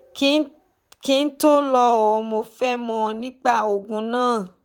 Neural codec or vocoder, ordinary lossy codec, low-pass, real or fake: vocoder, 44.1 kHz, 128 mel bands, Pupu-Vocoder; none; 19.8 kHz; fake